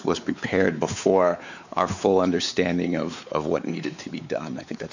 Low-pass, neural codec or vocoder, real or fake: 7.2 kHz; codec, 16 kHz, 4 kbps, X-Codec, WavLM features, trained on Multilingual LibriSpeech; fake